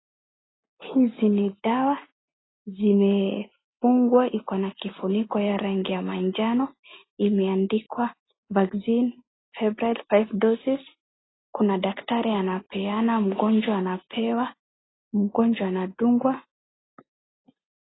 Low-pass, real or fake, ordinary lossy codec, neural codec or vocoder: 7.2 kHz; real; AAC, 16 kbps; none